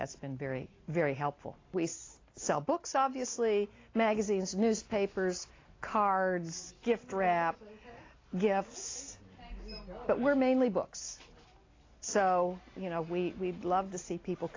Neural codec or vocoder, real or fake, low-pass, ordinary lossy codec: none; real; 7.2 kHz; AAC, 32 kbps